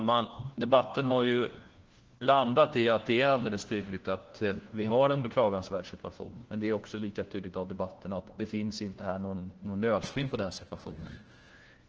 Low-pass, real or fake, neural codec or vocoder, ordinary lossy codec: 7.2 kHz; fake; codec, 16 kHz, 1 kbps, FunCodec, trained on LibriTTS, 50 frames a second; Opus, 16 kbps